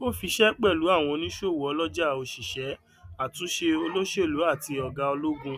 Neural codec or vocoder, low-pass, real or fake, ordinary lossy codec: none; 14.4 kHz; real; none